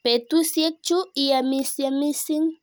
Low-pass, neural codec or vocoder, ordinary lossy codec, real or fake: none; none; none; real